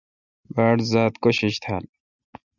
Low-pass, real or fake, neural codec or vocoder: 7.2 kHz; real; none